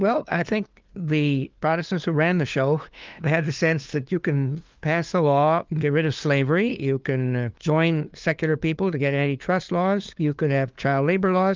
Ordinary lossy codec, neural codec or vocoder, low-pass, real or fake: Opus, 24 kbps; codec, 16 kHz, 2 kbps, FunCodec, trained on LibriTTS, 25 frames a second; 7.2 kHz; fake